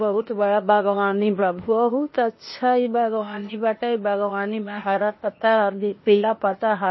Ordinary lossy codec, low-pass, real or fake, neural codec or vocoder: MP3, 24 kbps; 7.2 kHz; fake; codec, 16 kHz, 0.8 kbps, ZipCodec